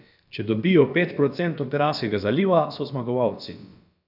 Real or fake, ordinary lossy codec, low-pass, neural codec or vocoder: fake; none; 5.4 kHz; codec, 16 kHz, about 1 kbps, DyCAST, with the encoder's durations